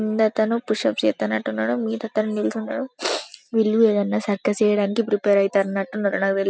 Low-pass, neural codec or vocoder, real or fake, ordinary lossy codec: none; none; real; none